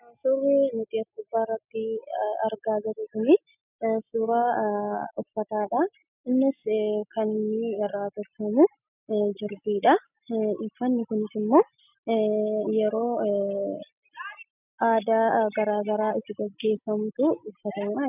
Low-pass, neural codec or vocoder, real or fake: 3.6 kHz; none; real